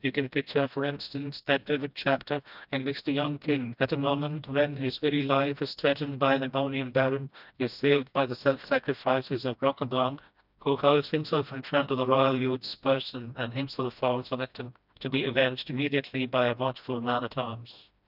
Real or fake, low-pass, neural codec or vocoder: fake; 5.4 kHz; codec, 16 kHz, 1 kbps, FreqCodec, smaller model